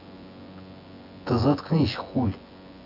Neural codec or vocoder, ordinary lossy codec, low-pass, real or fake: vocoder, 24 kHz, 100 mel bands, Vocos; AAC, 48 kbps; 5.4 kHz; fake